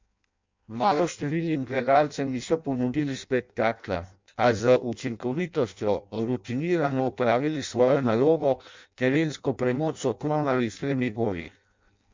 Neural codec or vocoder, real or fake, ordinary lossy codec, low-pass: codec, 16 kHz in and 24 kHz out, 0.6 kbps, FireRedTTS-2 codec; fake; MP3, 64 kbps; 7.2 kHz